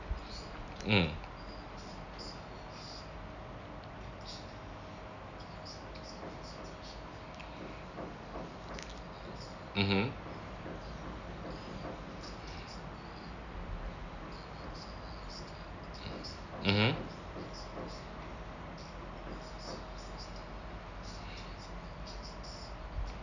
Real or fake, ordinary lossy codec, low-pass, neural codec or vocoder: real; none; 7.2 kHz; none